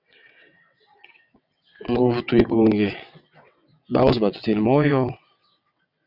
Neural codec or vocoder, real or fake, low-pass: vocoder, 22.05 kHz, 80 mel bands, WaveNeXt; fake; 5.4 kHz